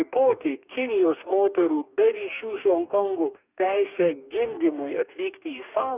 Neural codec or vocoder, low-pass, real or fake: codec, 44.1 kHz, 2.6 kbps, DAC; 3.6 kHz; fake